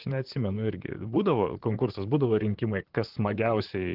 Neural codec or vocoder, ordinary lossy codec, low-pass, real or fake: vocoder, 44.1 kHz, 128 mel bands, Pupu-Vocoder; Opus, 16 kbps; 5.4 kHz; fake